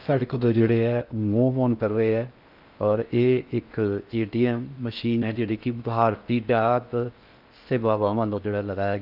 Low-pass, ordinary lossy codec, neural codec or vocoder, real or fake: 5.4 kHz; Opus, 24 kbps; codec, 16 kHz in and 24 kHz out, 0.6 kbps, FocalCodec, streaming, 4096 codes; fake